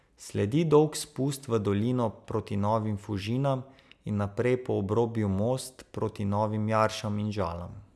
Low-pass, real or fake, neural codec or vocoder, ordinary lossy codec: none; real; none; none